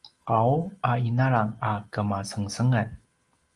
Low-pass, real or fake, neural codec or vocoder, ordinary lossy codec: 10.8 kHz; real; none; Opus, 24 kbps